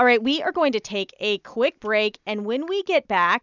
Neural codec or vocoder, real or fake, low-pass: none; real; 7.2 kHz